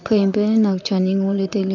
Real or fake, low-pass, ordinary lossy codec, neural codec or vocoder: fake; 7.2 kHz; none; vocoder, 44.1 kHz, 128 mel bands, Pupu-Vocoder